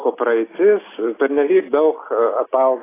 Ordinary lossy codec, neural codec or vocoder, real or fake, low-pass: AAC, 16 kbps; none; real; 3.6 kHz